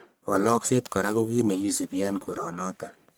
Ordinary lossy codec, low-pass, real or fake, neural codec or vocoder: none; none; fake; codec, 44.1 kHz, 1.7 kbps, Pupu-Codec